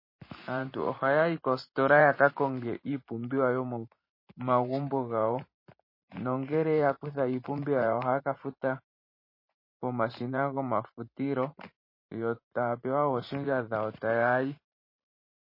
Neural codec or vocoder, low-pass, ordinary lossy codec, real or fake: vocoder, 44.1 kHz, 128 mel bands every 512 samples, BigVGAN v2; 5.4 kHz; MP3, 24 kbps; fake